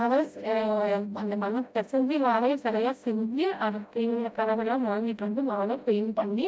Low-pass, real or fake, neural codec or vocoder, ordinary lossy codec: none; fake; codec, 16 kHz, 0.5 kbps, FreqCodec, smaller model; none